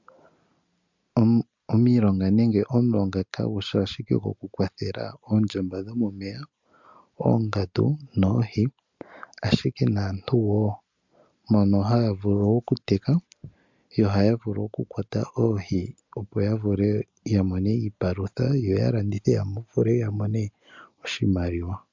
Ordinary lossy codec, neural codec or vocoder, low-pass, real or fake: MP3, 64 kbps; none; 7.2 kHz; real